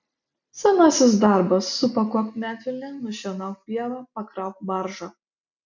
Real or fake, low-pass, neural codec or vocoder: real; 7.2 kHz; none